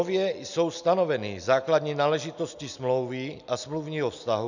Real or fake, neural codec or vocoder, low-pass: real; none; 7.2 kHz